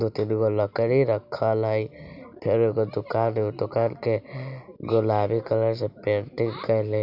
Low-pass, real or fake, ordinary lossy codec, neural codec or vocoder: 5.4 kHz; real; none; none